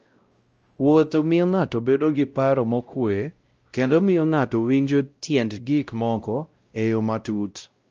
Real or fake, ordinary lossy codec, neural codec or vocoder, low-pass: fake; Opus, 24 kbps; codec, 16 kHz, 0.5 kbps, X-Codec, WavLM features, trained on Multilingual LibriSpeech; 7.2 kHz